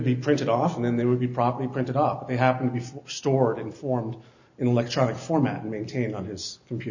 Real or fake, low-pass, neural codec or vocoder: real; 7.2 kHz; none